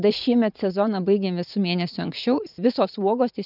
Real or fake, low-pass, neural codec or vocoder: fake; 5.4 kHz; vocoder, 44.1 kHz, 80 mel bands, Vocos